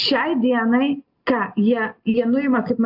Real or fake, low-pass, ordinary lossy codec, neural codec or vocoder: real; 5.4 kHz; AAC, 48 kbps; none